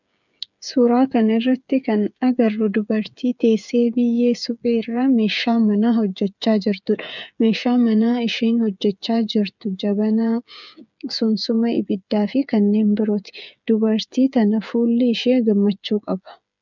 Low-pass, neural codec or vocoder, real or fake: 7.2 kHz; codec, 16 kHz, 8 kbps, FreqCodec, smaller model; fake